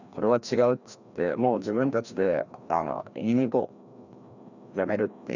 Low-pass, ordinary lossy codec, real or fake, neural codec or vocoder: 7.2 kHz; none; fake; codec, 16 kHz, 1 kbps, FreqCodec, larger model